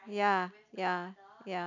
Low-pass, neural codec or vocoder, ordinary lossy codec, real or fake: 7.2 kHz; none; none; real